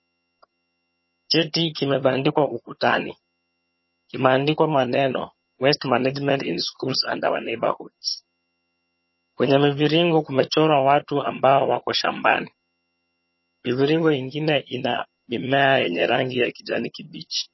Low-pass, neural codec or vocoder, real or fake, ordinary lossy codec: 7.2 kHz; vocoder, 22.05 kHz, 80 mel bands, HiFi-GAN; fake; MP3, 24 kbps